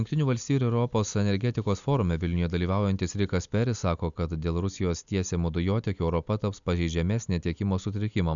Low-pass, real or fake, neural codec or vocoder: 7.2 kHz; real; none